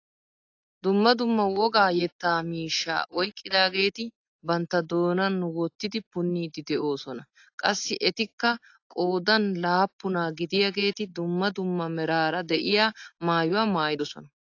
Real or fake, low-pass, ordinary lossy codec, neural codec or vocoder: real; 7.2 kHz; AAC, 48 kbps; none